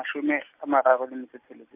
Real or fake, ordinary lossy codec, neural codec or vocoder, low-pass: real; MP3, 32 kbps; none; 3.6 kHz